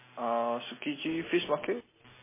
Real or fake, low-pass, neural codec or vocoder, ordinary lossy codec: real; 3.6 kHz; none; MP3, 16 kbps